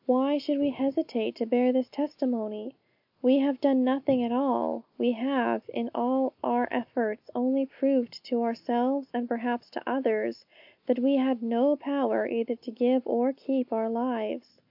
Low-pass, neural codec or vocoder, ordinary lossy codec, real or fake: 5.4 kHz; none; MP3, 48 kbps; real